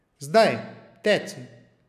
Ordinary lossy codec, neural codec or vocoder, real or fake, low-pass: none; none; real; 14.4 kHz